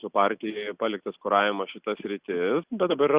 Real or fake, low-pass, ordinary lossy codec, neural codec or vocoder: real; 3.6 kHz; Opus, 64 kbps; none